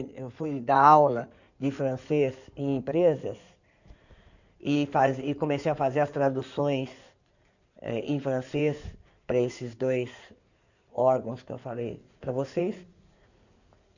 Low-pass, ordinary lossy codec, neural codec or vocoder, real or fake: 7.2 kHz; none; codec, 16 kHz in and 24 kHz out, 2.2 kbps, FireRedTTS-2 codec; fake